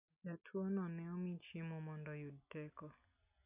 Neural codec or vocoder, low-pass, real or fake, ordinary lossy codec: none; 3.6 kHz; real; none